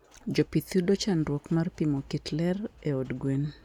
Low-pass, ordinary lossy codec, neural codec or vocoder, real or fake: 19.8 kHz; none; none; real